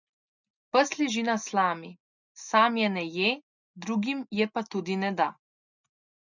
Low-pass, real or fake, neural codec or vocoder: 7.2 kHz; real; none